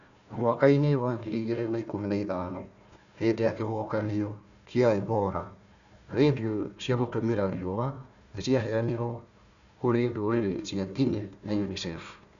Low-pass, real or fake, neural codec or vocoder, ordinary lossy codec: 7.2 kHz; fake; codec, 16 kHz, 1 kbps, FunCodec, trained on Chinese and English, 50 frames a second; none